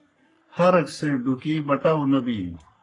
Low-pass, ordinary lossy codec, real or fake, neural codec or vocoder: 10.8 kHz; AAC, 32 kbps; fake; codec, 44.1 kHz, 3.4 kbps, Pupu-Codec